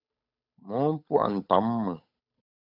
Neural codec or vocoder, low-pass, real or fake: codec, 16 kHz, 8 kbps, FunCodec, trained on Chinese and English, 25 frames a second; 5.4 kHz; fake